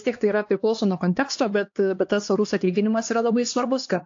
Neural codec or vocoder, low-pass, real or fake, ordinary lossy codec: codec, 16 kHz, 2 kbps, X-Codec, HuBERT features, trained on LibriSpeech; 7.2 kHz; fake; AAC, 48 kbps